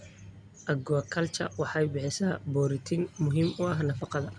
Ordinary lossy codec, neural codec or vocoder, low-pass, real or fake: none; none; 9.9 kHz; real